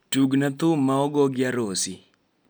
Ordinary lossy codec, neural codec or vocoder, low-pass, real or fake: none; none; none; real